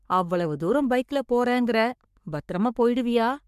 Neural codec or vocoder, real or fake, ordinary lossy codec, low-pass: codec, 44.1 kHz, 3.4 kbps, Pupu-Codec; fake; MP3, 96 kbps; 14.4 kHz